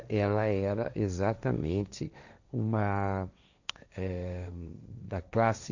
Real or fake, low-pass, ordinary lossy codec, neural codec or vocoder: fake; 7.2 kHz; none; codec, 16 kHz, 1.1 kbps, Voila-Tokenizer